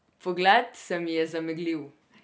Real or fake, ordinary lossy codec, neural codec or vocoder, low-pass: real; none; none; none